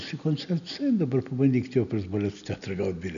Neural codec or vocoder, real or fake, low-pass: none; real; 7.2 kHz